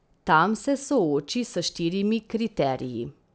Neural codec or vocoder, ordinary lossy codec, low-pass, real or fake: none; none; none; real